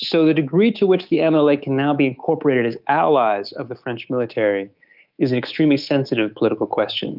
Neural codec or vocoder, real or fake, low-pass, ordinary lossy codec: codec, 24 kHz, 3.1 kbps, DualCodec; fake; 5.4 kHz; Opus, 24 kbps